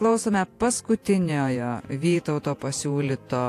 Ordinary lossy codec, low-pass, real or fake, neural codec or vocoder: AAC, 64 kbps; 14.4 kHz; fake; vocoder, 48 kHz, 128 mel bands, Vocos